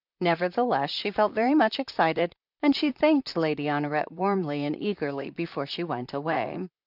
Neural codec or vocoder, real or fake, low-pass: vocoder, 44.1 kHz, 128 mel bands, Pupu-Vocoder; fake; 5.4 kHz